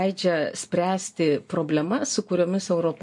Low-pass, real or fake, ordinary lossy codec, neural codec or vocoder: 10.8 kHz; real; MP3, 48 kbps; none